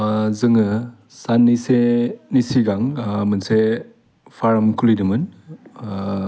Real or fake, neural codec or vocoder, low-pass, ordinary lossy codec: real; none; none; none